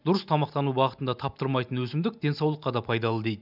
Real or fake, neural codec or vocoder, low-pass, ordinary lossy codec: real; none; 5.4 kHz; none